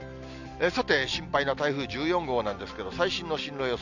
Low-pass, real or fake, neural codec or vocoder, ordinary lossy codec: 7.2 kHz; real; none; none